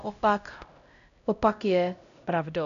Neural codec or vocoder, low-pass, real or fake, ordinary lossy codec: codec, 16 kHz, 0.5 kbps, X-Codec, HuBERT features, trained on LibriSpeech; 7.2 kHz; fake; MP3, 96 kbps